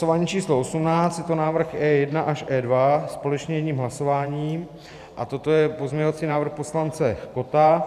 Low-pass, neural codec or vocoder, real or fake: 14.4 kHz; vocoder, 44.1 kHz, 128 mel bands every 512 samples, BigVGAN v2; fake